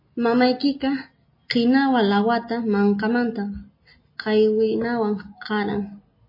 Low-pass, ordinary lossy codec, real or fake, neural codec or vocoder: 5.4 kHz; MP3, 24 kbps; real; none